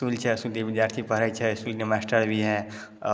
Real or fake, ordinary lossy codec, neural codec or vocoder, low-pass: real; none; none; none